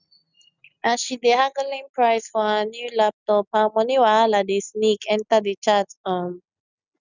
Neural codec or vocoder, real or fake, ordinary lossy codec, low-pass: none; real; none; 7.2 kHz